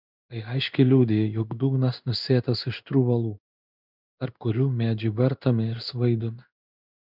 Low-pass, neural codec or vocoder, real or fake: 5.4 kHz; codec, 16 kHz in and 24 kHz out, 1 kbps, XY-Tokenizer; fake